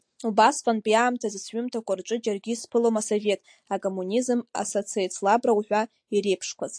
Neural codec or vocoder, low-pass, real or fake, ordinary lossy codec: none; 9.9 kHz; real; AAC, 64 kbps